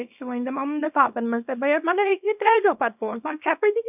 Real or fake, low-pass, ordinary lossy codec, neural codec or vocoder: fake; 3.6 kHz; none; codec, 24 kHz, 0.9 kbps, WavTokenizer, small release